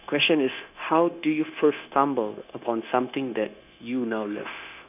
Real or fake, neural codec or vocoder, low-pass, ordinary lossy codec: fake; codec, 16 kHz, 0.9 kbps, LongCat-Audio-Codec; 3.6 kHz; none